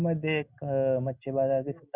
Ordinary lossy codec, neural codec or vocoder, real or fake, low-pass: Opus, 64 kbps; none; real; 3.6 kHz